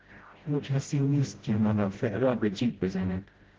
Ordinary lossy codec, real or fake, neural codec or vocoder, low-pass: Opus, 16 kbps; fake; codec, 16 kHz, 0.5 kbps, FreqCodec, smaller model; 7.2 kHz